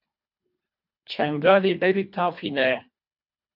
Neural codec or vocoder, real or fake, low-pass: codec, 24 kHz, 1.5 kbps, HILCodec; fake; 5.4 kHz